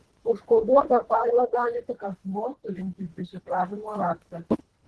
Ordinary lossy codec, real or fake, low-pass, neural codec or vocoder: Opus, 16 kbps; fake; 10.8 kHz; codec, 24 kHz, 1.5 kbps, HILCodec